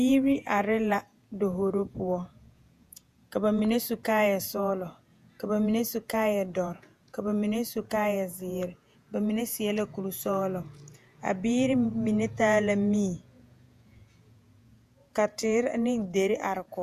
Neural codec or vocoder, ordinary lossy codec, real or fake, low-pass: vocoder, 48 kHz, 128 mel bands, Vocos; MP3, 96 kbps; fake; 14.4 kHz